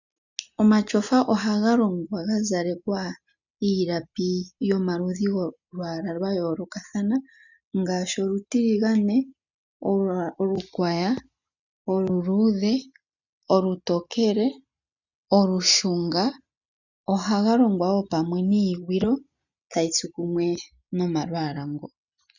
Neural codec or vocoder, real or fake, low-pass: none; real; 7.2 kHz